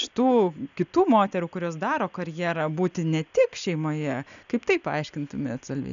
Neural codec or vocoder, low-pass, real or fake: none; 7.2 kHz; real